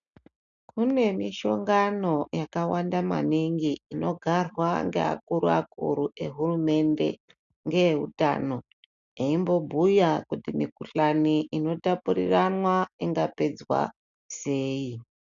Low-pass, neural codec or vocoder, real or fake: 7.2 kHz; none; real